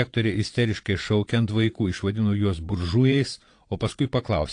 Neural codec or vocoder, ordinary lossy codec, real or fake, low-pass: vocoder, 22.05 kHz, 80 mel bands, Vocos; AAC, 48 kbps; fake; 9.9 kHz